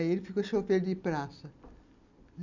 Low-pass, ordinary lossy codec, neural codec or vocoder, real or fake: 7.2 kHz; none; none; real